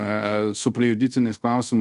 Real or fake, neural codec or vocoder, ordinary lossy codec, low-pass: fake; codec, 24 kHz, 0.5 kbps, DualCodec; AAC, 96 kbps; 10.8 kHz